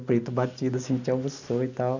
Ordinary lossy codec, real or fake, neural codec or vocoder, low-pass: none; real; none; 7.2 kHz